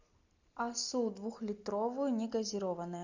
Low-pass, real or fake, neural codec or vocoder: 7.2 kHz; real; none